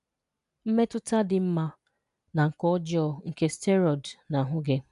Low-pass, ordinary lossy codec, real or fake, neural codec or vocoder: 10.8 kHz; none; real; none